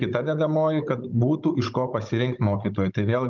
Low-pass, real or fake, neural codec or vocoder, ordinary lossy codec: 7.2 kHz; fake; codec, 16 kHz, 16 kbps, FunCodec, trained on Chinese and English, 50 frames a second; Opus, 24 kbps